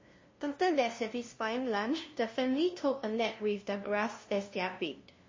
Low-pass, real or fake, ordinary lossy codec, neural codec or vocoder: 7.2 kHz; fake; MP3, 32 kbps; codec, 16 kHz, 0.5 kbps, FunCodec, trained on LibriTTS, 25 frames a second